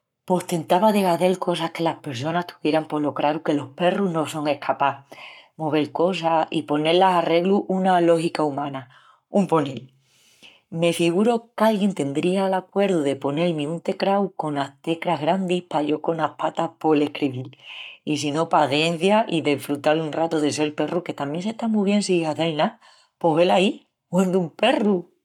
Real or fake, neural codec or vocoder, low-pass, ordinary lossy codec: fake; codec, 44.1 kHz, 7.8 kbps, Pupu-Codec; 19.8 kHz; none